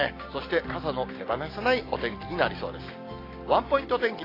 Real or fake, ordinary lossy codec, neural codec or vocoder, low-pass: real; AAC, 24 kbps; none; 5.4 kHz